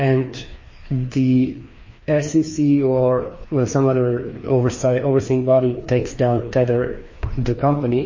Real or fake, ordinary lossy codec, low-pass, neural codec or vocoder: fake; MP3, 32 kbps; 7.2 kHz; codec, 16 kHz, 2 kbps, FreqCodec, larger model